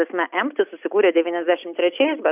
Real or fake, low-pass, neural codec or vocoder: real; 3.6 kHz; none